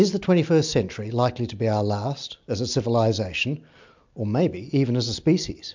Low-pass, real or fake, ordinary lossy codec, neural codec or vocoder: 7.2 kHz; real; MP3, 64 kbps; none